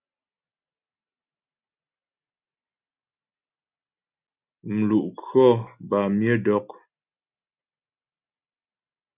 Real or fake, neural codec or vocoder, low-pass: real; none; 3.6 kHz